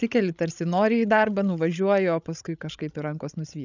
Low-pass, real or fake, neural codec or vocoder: 7.2 kHz; fake; codec, 16 kHz, 16 kbps, FreqCodec, larger model